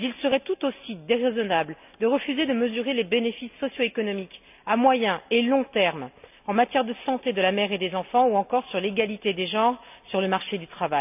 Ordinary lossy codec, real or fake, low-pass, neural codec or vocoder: none; real; 3.6 kHz; none